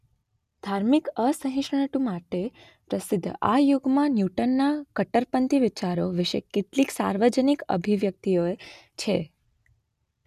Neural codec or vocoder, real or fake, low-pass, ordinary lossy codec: none; real; 14.4 kHz; none